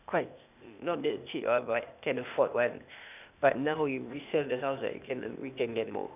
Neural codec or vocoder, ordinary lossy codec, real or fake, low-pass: codec, 16 kHz, 0.8 kbps, ZipCodec; none; fake; 3.6 kHz